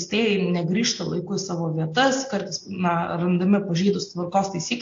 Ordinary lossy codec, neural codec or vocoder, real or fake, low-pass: AAC, 48 kbps; none; real; 7.2 kHz